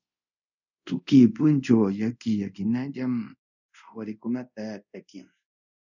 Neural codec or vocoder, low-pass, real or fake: codec, 24 kHz, 0.5 kbps, DualCodec; 7.2 kHz; fake